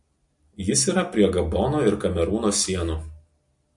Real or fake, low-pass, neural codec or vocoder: real; 10.8 kHz; none